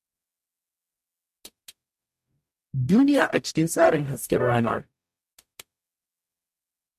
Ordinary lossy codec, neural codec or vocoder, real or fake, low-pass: MP3, 64 kbps; codec, 44.1 kHz, 0.9 kbps, DAC; fake; 14.4 kHz